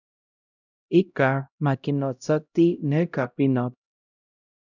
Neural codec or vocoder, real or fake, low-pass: codec, 16 kHz, 0.5 kbps, X-Codec, HuBERT features, trained on LibriSpeech; fake; 7.2 kHz